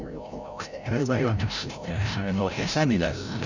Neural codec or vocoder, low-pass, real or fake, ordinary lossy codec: codec, 16 kHz, 0.5 kbps, FreqCodec, larger model; 7.2 kHz; fake; none